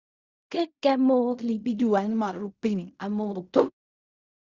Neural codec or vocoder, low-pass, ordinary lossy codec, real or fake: codec, 16 kHz in and 24 kHz out, 0.4 kbps, LongCat-Audio-Codec, fine tuned four codebook decoder; 7.2 kHz; Opus, 64 kbps; fake